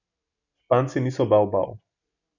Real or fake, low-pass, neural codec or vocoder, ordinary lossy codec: real; 7.2 kHz; none; none